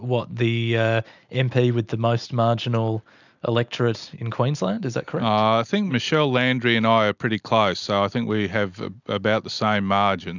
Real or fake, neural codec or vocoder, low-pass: real; none; 7.2 kHz